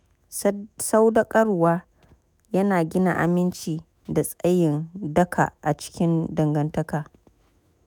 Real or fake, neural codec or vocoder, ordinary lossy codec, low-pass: fake; autoencoder, 48 kHz, 128 numbers a frame, DAC-VAE, trained on Japanese speech; none; none